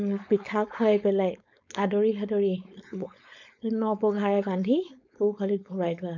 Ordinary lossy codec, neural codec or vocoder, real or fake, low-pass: none; codec, 16 kHz, 4.8 kbps, FACodec; fake; 7.2 kHz